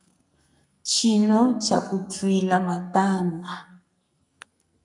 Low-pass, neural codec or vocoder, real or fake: 10.8 kHz; codec, 44.1 kHz, 2.6 kbps, SNAC; fake